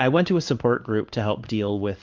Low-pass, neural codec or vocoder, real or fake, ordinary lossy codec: 7.2 kHz; codec, 16 kHz in and 24 kHz out, 1 kbps, XY-Tokenizer; fake; Opus, 24 kbps